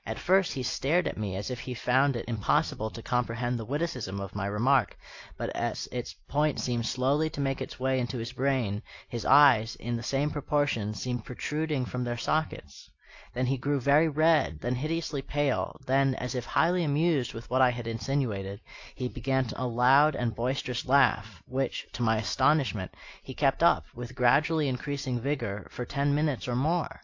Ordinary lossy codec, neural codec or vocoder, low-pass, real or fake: AAC, 48 kbps; none; 7.2 kHz; real